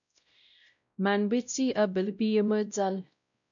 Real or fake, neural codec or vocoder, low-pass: fake; codec, 16 kHz, 0.5 kbps, X-Codec, WavLM features, trained on Multilingual LibriSpeech; 7.2 kHz